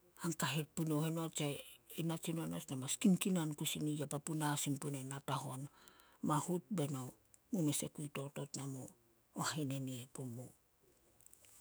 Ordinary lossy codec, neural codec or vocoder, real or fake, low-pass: none; autoencoder, 48 kHz, 128 numbers a frame, DAC-VAE, trained on Japanese speech; fake; none